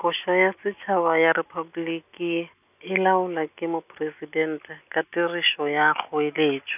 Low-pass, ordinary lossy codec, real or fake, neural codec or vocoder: 3.6 kHz; none; real; none